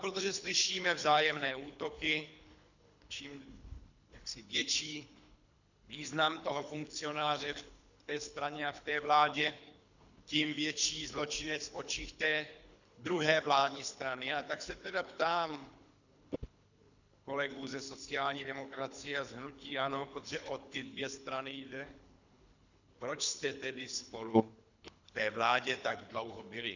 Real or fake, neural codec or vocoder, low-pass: fake; codec, 24 kHz, 3 kbps, HILCodec; 7.2 kHz